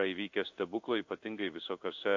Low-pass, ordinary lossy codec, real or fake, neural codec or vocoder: 7.2 kHz; MP3, 48 kbps; fake; codec, 16 kHz in and 24 kHz out, 1 kbps, XY-Tokenizer